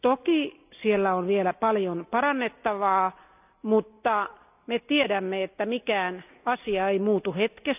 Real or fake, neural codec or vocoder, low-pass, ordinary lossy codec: real; none; 3.6 kHz; none